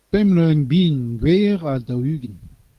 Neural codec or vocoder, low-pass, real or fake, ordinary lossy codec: codec, 44.1 kHz, 7.8 kbps, DAC; 14.4 kHz; fake; Opus, 24 kbps